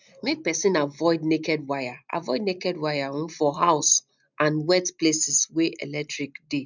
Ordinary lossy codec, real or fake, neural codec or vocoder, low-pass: none; real; none; 7.2 kHz